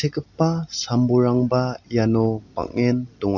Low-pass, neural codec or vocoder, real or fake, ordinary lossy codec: 7.2 kHz; none; real; none